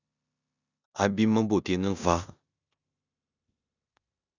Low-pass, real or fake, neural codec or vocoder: 7.2 kHz; fake; codec, 16 kHz in and 24 kHz out, 0.9 kbps, LongCat-Audio-Codec, four codebook decoder